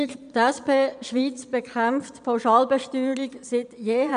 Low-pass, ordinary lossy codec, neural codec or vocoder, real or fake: 9.9 kHz; none; vocoder, 22.05 kHz, 80 mel bands, Vocos; fake